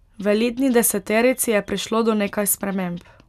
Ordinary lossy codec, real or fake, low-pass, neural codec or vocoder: none; real; 14.4 kHz; none